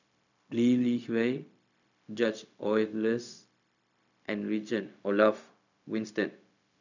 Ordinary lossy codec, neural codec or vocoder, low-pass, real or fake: none; codec, 16 kHz, 0.4 kbps, LongCat-Audio-Codec; 7.2 kHz; fake